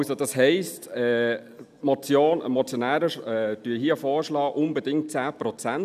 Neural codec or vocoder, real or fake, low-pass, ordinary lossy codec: none; real; 14.4 kHz; none